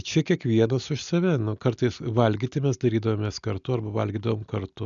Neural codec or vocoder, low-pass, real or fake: none; 7.2 kHz; real